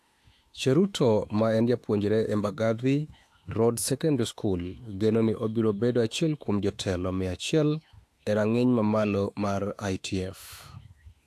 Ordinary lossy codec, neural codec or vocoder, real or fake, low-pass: AAC, 64 kbps; autoencoder, 48 kHz, 32 numbers a frame, DAC-VAE, trained on Japanese speech; fake; 14.4 kHz